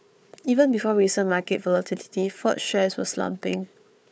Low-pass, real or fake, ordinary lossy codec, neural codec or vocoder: none; fake; none; codec, 16 kHz, 4 kbps, FunCodec, trained on Chinese and English, 50 frames a second